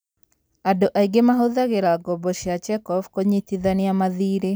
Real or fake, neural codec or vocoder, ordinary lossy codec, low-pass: real; none; none; none